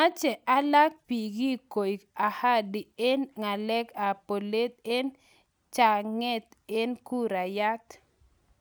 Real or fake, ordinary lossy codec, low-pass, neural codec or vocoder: real; none; none; none